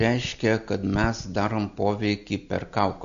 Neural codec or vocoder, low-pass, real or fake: none; 7.2 kHz; real